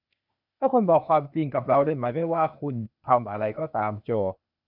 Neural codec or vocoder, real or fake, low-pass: codec, 16 kHz, 0.8 kbps, ZipCodec; fake; 5.4 kHz